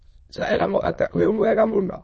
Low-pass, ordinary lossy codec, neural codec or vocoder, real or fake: 9.9 kHz; MP3, 32 kbps; autoencoder, 22.05 kHz, a latent of 192 numbers a frame, VITS, trained on many speakers; fake